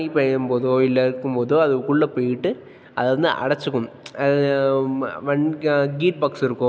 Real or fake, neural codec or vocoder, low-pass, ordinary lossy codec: real; none; none; none